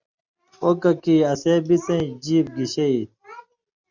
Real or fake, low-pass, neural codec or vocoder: real; 7.2 kHz; none